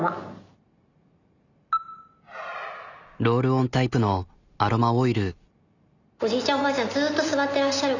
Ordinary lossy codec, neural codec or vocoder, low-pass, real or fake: none; none; 7.2 kHz; real